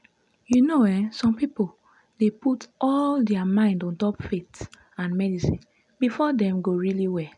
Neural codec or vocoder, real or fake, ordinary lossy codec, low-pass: none; real; none; 10.8 kHz